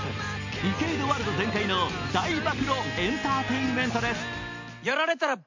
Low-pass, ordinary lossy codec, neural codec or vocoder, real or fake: 7.2 kHz; MP3, 48 kbps; none; real